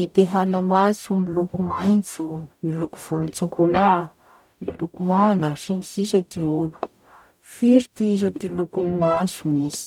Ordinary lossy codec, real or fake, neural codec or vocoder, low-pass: none; fake; codec, 44.1 kHz, 0.9 kbps, DAC; 19.8 kHz